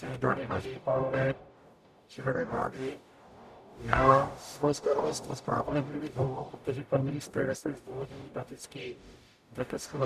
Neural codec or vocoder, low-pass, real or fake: codec, 44.1 kHz, 0.9 kbps, DAC; 14.4 kHz; fake